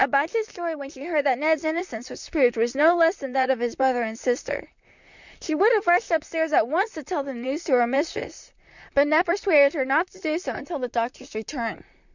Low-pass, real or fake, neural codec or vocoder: 7.2 kHz; fake; vocoder, 44.1 kHz, 128 mel bands, Pupu-Vocoder